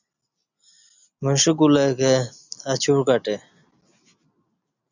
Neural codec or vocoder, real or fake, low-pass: none; real; 7.2 kHz